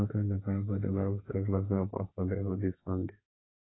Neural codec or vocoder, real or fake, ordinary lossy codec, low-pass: codec, 32 kHz, 1.9 kbps, SNAC; fake; AAC, 16 kbps; 7.2 kHz